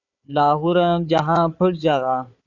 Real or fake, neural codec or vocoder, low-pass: fake; codec, 16 kHz, 4 kbps, FunCodec, trained on Chinese and English, 50 frames a second; 7.2 kHz